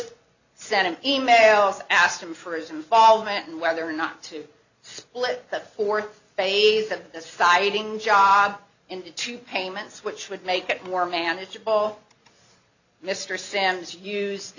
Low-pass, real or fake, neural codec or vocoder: 7.2 kHz; real; none